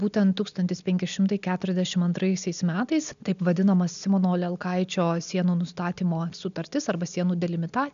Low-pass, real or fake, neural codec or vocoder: 7.2 kHz; real; none